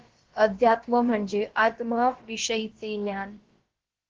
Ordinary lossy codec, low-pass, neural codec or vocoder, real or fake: Opus, 16 kbps; 7.2 kHz; codec, 16 kHz, about 1 kbps, DyCAST, with the encoder's durations; fake